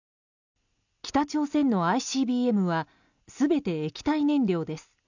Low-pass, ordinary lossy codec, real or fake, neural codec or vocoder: 7.2 kHz; none; real; none